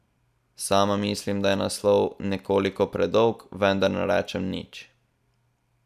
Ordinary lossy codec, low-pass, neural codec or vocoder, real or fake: none; 14.4 kHz; none; real